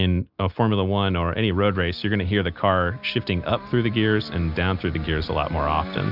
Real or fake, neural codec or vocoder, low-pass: real; none; 5.4 kHz